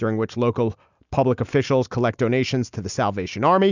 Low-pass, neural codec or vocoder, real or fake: 7.2 kHz; none; real